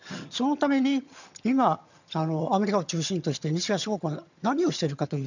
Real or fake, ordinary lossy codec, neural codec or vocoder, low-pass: fake; none; vocoder, 22.05 kHz, 80 mel bands, HiFi-GAN; 7.2 kHz